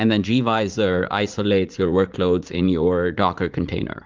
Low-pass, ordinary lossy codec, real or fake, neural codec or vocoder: 7.2 kHz; Opus, 24 kbps; fake; vocoder, 44.1 kHz, 80 mel bands, Vocos